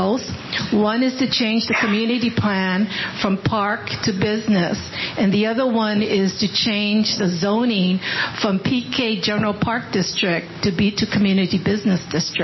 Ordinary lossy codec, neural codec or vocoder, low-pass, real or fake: MP3, 24 kbps; none; 7.2 kHz; real